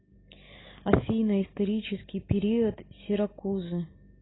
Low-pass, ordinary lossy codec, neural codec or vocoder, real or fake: 7.2 kHz; AAC, 16 kbps; none; real